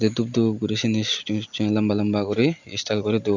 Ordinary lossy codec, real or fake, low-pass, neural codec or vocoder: none; real; 7.2 kHz; none